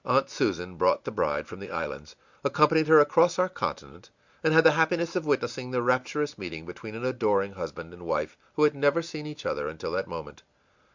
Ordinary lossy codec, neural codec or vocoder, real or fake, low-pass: Opus, 64 kbps; none; real; 7.2 kHz